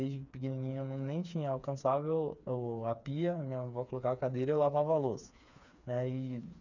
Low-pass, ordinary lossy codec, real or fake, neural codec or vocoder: 7.2 kHz; none; fake; codec, 16 kHz, 4 kbps, FreqCodec, smaller model